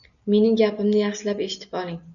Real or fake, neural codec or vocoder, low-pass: real; none; 7.2 kHz